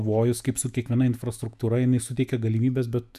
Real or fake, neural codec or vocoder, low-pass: fake; autoencoder, 48 kHz, 128 numbers a frame, DAC-VAE, trained on Japanese speech; 14.4 kHz